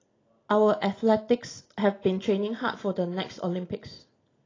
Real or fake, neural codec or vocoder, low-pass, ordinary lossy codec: real; none; 7.2 kHz; AAC, 32 kbps